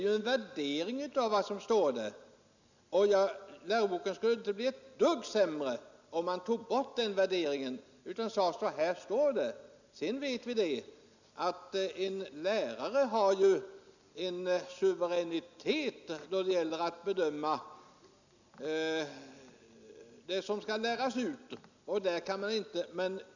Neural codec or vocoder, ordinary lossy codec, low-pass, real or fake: none; none; 7.2 kHz; real